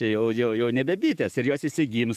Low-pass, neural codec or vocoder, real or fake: 14.4 kHz; codec, 44.1 kHz, 7.8 kbps, DAC; fake